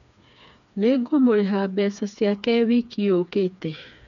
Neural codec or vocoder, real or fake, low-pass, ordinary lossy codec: codec, 16 kHz, 4 kbps, FreqCodec, smaller model; fake; 7.2 kHz; MP3, 96 kbps